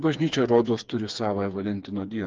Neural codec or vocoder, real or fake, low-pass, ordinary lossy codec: codec, 16 kHz, 4 kbps, FreqCodec, smaller model; fake; 7.2 kHz; Opus, 24 kbps